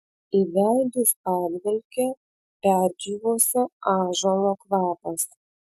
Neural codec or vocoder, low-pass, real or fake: none; 14.4 kHz; real